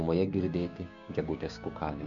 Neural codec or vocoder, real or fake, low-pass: codec, 16 kHz, 6 kbps, DAC; fake; 7.2 kHz